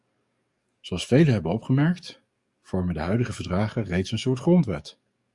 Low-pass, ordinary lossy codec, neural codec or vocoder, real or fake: 10.8 kHz; Opus, 64 kbps; codec, 44.1 kHz, 7.8 kbps, DAC; fake